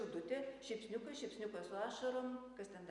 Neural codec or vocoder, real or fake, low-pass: none; real; 10.8 kHz